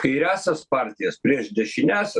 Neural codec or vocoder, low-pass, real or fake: none; 10.8 kHz; real